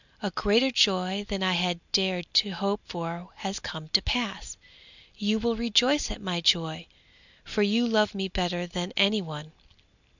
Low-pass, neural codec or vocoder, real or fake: 7.2 kHz; none; real